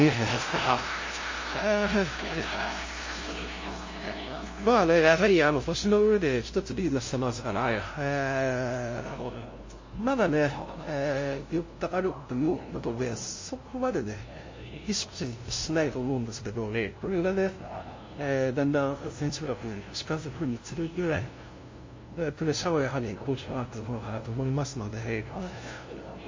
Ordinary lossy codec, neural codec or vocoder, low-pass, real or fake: MP3, 32 kbps; codec, 16 kHz, 0.5 kbps, FunCodec, trained on LibriTTS, 25 frames a second; 7.2 kHz; fake